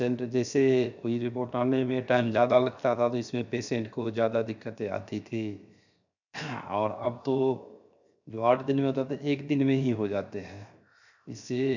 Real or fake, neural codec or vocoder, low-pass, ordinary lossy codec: fake; codec, 16 kHz, 0.7 kbps, FocalCodec; 7.2 kHz; none